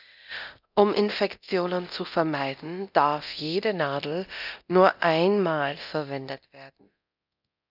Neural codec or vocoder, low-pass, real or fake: codec, 24 kHz, 0.9 kbps, DualCodec; 5.4 kHz; fake